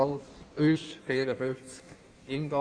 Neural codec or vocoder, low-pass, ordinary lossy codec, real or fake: codec, 16 kHz in and 24 kHz out, 1.1 kbps, FireRedTTS-2 codec; 9.9 kHz; none; fake